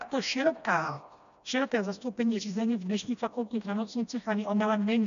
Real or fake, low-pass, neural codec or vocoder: fake; 7.2 kHz; codec, 16 kHz, 1 kbps, FreqCodec, smaller model